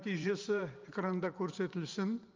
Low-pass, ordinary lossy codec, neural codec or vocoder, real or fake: 7.2 kHz; Opus, 24 kbps; vocoder, 44.1 kHz, 128 mel bands every 512 samples, BigVGAN v2; fake